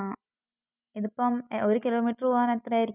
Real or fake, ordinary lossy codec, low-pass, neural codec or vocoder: real; none; 3.6 kHz; none